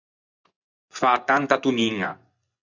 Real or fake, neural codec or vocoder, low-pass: fake; vocoder, 24 kHz, 100 mel bands, Vocos; 7.2 kHz